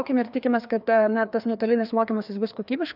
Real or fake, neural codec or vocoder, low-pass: fake; codec, 16 kHz, 2 kbps, FreqCodec, larger model; 5.4 kHz